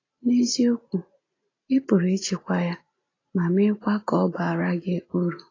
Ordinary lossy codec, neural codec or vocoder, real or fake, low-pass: AAC, 32 kbps; none; real; 7.2 kHz